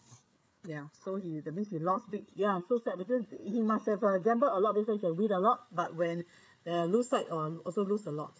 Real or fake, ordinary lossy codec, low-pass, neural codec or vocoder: fake; none; none; codec, 16 kHz, 8 kbps, FreqCodec, larger model